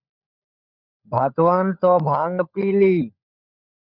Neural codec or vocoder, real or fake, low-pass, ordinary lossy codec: codec, 16 kHz, 16 kbps, FunCodec, trained on LibriTTS, 50 frames a second; fake; 5.4 kHz; Opus, 64 kbps